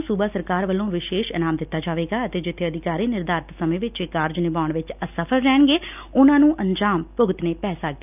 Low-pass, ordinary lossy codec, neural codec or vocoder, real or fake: 3.6 kHz; none; none; real